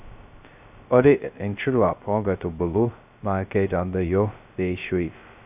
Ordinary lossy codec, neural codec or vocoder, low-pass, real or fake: none; codec, 16 kHz, 0.2 kbps, FocalCodec; 3.6 kHz; fake